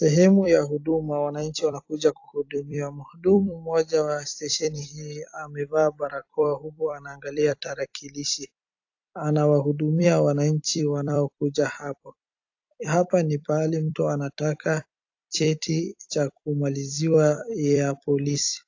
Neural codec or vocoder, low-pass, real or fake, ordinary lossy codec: vocoder, 44.1 kHz, 128 mel bands every 256 samples, BigVGAN v2; 7.2 kHz; fake; AAC, 48 kbps